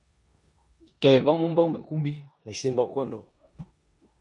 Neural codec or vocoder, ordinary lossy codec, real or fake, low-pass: codec, 16 kHz in and 24 kHz out, 0.9 kbps, LongCat-Audio-Codec, four codebook decoder; MP3, 64 kbps; fake; 10.8 kHz